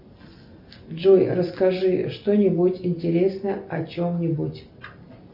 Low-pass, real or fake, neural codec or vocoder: 5.4 kHz; real; none